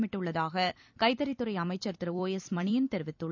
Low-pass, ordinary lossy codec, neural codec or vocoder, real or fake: 7.2 kHz; none; vocoder, 44.1 kHz, 128 mel bands every 256 samples, BigVGAN v2; fake